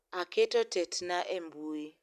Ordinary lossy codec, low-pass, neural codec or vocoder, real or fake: none; 14.4 kHz; none; real